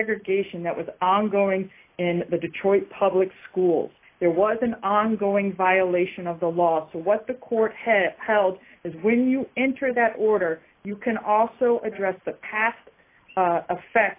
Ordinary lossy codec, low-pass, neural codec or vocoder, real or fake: AAC, 24 kbps; 3.6 kHz; none; real